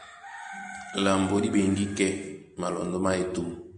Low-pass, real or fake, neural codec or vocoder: 9.9 kHz; real; none